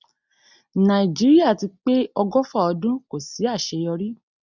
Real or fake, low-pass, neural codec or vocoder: real; 7.2 kHz; none